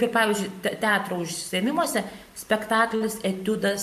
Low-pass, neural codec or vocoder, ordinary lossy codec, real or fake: 14.4 kHz; vocoder, 44.1 kHz, 128 mel bands every 512 samples, BigVGAN v2; MP3, 64 kbps; fake